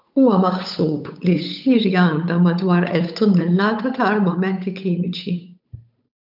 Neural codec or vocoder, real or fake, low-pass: codec, 16 kHz, 8 kbps, FunCodec, trained on Chinese and English, 25 frames a second; fake; 5.4 kHz